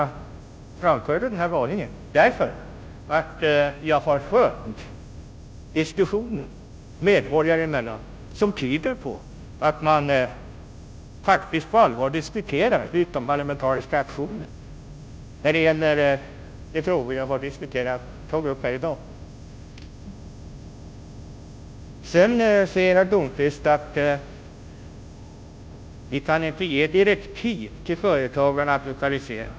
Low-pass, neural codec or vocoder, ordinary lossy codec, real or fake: none; codec, 16 kHz, 0.5 kbps, FunCodec, trained on Chinese and English, 25 frames a second; none; fake